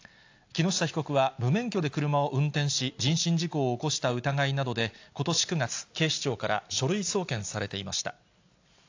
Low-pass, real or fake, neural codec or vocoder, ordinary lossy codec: 7.2 kHz; real; none; AAC, 48 kbps